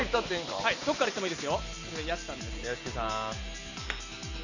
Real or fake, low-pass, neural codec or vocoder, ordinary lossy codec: real; 7.2 kHz; none; none